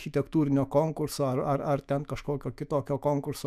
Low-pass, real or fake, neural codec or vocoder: 14.4 kHz; fake; autoencoder, 48 kHz, 128 numbers a frame, DAC-VAE, trained on Japanese speech